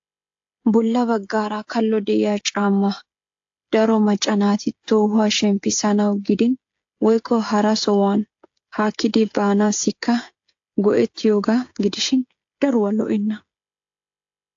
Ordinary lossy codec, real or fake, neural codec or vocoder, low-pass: AAC, 48 kbps; fake; codec, 16 kHz, 16 kbps, FreqCodec, smaller model; 7.2 kHz